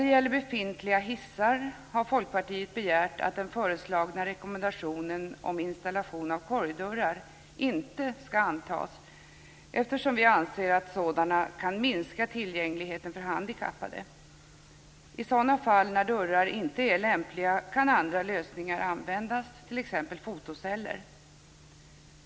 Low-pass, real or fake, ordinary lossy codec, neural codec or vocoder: none; real; none; none